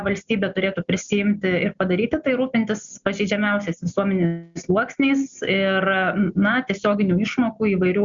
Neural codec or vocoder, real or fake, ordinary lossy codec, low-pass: none; real; Opus, 64 kbps; 7.2 kHz